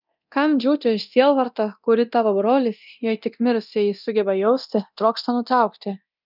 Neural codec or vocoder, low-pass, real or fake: codec, 24 kHz, 0.9 kbps, DualCodec; 5.4 kHz; fake